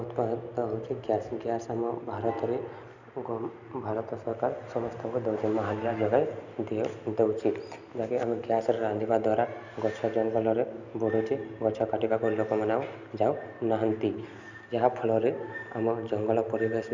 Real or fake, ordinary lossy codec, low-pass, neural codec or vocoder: real; none; 7.2 kHz; none